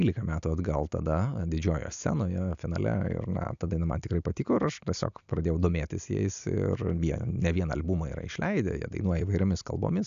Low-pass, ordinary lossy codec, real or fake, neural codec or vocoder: 7.2 kHz; AAC, 96 kbps; real; none